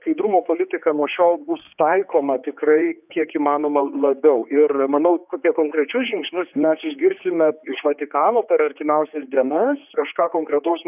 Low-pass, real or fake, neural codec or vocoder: 3.6 kHz; fake; codec, 16 kHz, 4 kbps, X-Codec, HuBERT features, trained on general audio